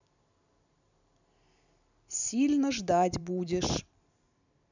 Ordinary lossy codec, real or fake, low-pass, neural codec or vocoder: none; fake; 7.2 kHz; vocoder, 44.1 kHz, 128 mel bands every 256 samples, BigVGAN v2